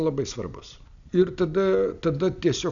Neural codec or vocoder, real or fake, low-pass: none; real; 7.2 kHz